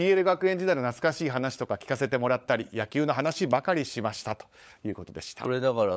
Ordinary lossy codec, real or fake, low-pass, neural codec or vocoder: none; fake; none; codec, 16 kHz, 16 kbps, FunCodec, trained on LibriTTS, 50 frames a second